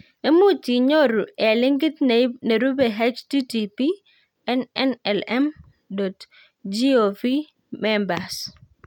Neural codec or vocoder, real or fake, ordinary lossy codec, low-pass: none; real; none; 19.8 kHz